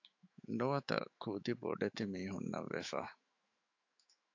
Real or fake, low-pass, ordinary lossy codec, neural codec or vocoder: fake; 7.2 kHz; AAC, 48 kbps; autoencoder, 48 kHz, 128 numbers a frame, DAC-VAE, trained on Japanese speech